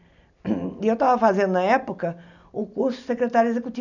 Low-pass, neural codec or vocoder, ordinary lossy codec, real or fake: 7.2 kHz; none; none; real